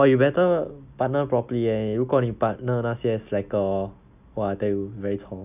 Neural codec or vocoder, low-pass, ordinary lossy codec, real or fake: none; 3.6 kHz; none; real